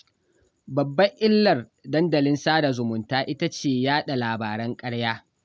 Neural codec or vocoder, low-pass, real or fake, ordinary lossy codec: none; none; real; none